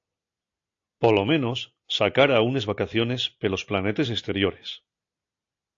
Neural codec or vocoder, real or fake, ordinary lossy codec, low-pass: none; real; AAC, 48 kbps; 7.2 kHz